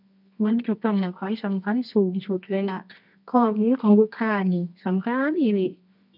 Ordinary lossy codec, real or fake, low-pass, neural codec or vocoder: none; fake; 5.4 kHz; codec, 24 kHz, 0.9 kbps, WavTokenizer, medium music audio release